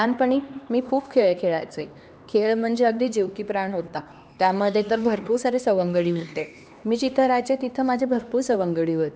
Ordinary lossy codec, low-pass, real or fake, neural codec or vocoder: none; none; fake; codec, 16 kHz, 2 kbps, X-Codec, HuBERT features, trained on LibriSpeech